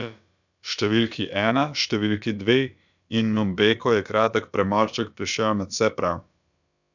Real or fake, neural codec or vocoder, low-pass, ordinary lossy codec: fake; codec, 16 kHz, about 1 kbps, DyCAST, with the encoder's durations; 7.2 kHz; none